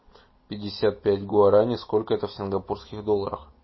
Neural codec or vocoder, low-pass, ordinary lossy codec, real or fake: none; 7.2 kHz; MP3, 24 kbps; real